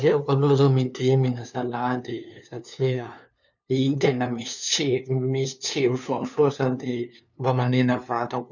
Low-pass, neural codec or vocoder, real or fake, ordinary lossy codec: 7.2 kHz; codec, 16 kHz, 2 kbps, FunCodec, trained on LibriTTS, 25 frames a second; fake; none